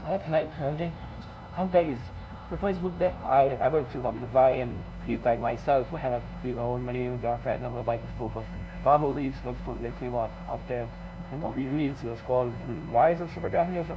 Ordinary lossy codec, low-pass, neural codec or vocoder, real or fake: none; none; codec, 16 kHz, 0.5 kbps, FunCodec, trained on LibriTTS, 25 frames a second; fake